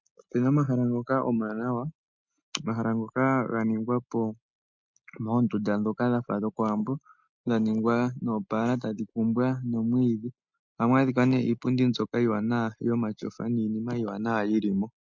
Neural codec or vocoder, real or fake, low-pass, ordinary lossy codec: none; real; 7.2 kHz; MP3, 64 kbps